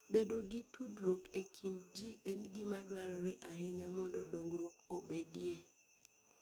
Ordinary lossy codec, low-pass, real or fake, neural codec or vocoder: none; none; fake; codec, 44.1 kHz, 2.6 kbps, DAC